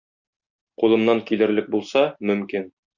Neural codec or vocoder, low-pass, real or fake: none; 7.2 kHz; real